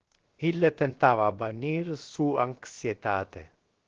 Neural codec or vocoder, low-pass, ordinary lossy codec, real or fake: codec, 16 kHz, 0.7 kbps, FocalCodec; 7.2 kHz; Opus, 16 kbps; fake